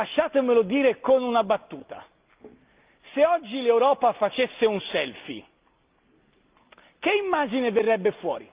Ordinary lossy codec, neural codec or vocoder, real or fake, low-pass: Opus, 24 kbps; none; real; 3.6 kHz